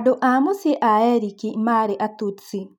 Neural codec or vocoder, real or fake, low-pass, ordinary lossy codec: none; real; 19.8 kHz; none